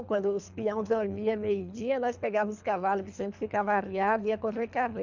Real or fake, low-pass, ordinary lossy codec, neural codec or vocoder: fake; 7.2 kHz; none; codec, 24 kHz, 3 kbps, HILCodec